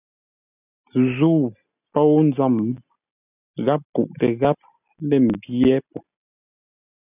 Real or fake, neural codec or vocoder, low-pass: real; none; 3.6 kHz